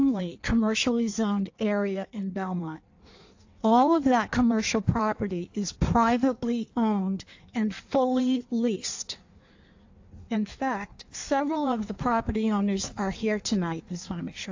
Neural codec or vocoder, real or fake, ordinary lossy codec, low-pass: codec, 16 kHz in and 24 kHz out, 1.1 kbps, FireRedTTS-2 codec; fake; AAC, 48 kbps; 7.2 kHz